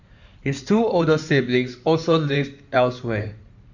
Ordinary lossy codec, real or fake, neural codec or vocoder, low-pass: none; fake; codec, 16 kHz in and 24 kHz out, 2.2 kbps, FireRedTTS-2 codec; 7.2 kHz